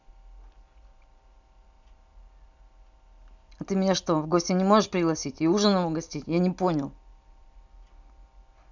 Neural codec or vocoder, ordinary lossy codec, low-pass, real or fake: none; none; 7.2 kHz; real